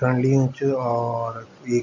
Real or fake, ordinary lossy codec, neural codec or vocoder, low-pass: real; none; none; 7.2 kHz